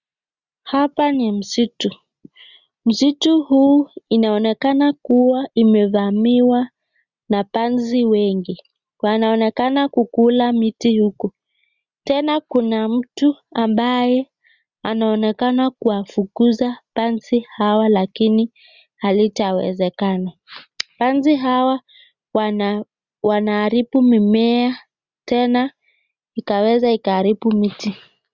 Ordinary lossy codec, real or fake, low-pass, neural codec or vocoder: Opus, 64 kbps; real; 7.2 kHz; none